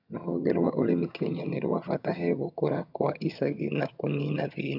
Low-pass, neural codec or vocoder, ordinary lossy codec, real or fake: 5.4 kHz; vocoder, 22.05 kHz, 80 mel bands, HiFi-GAN; none; fake